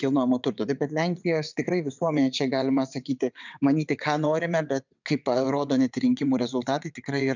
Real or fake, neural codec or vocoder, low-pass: fake; vocoder, 22.05 kHz, 80 mel bands, WaveNeXt; 7.2 kHz